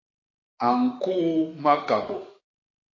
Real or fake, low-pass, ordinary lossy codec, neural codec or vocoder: fake; 7.2 kHz; MP3, 32 kbps; autoencoder, 48 kHz, 32 numbers a frame, DAC-VAE, trained on Japanese speech